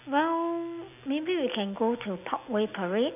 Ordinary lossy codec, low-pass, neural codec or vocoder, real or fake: none; 3.6 kHz; none; real